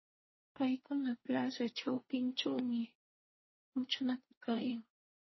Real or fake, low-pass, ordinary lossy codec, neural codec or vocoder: fake; 7.2 kHz; MP3, 24 kbps; codec, 24 kHz, 1 kbps, SNAC